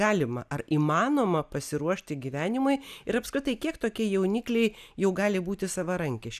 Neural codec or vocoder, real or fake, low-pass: none; real; 14.4 kHz